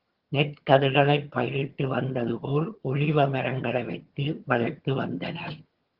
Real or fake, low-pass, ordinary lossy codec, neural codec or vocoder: fake; 5.4 kHz; Opus, 16 kbps; vocoder, 22.05 kHz, 80 mel bands, HiFi-GAN